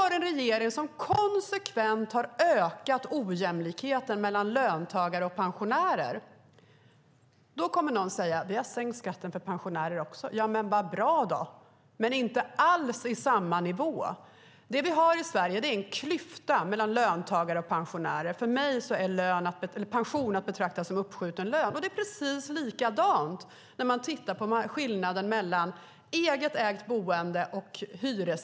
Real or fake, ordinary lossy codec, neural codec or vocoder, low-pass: real; none; none; none